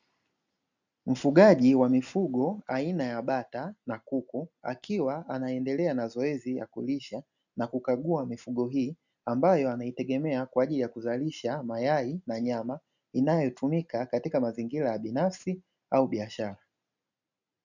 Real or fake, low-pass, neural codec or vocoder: real; 7.2 kHz; none